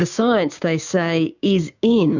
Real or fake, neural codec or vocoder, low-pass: fake; codec, 16 kHz, 6 kbps, DAC; 7.2 kHz